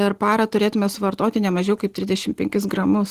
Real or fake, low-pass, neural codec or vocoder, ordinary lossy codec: real; 14.4 kHz; none; Opus, 16 kbps